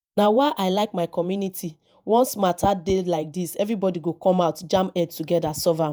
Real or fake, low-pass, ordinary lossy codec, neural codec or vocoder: fake; none; none; vocoder, 48 kHz, 128 mel bands, Vocos